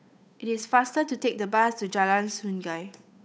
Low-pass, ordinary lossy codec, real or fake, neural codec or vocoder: none; none; fake; codec, 16 kHz, 8 kbps, FunCodec, trained on Chinese and English, 25 frames a second